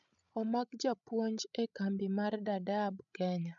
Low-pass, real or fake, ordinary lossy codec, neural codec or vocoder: 7.2 kHz; fake; none; codec, 16 kHz, 16 kbps, FreqCodec, larger model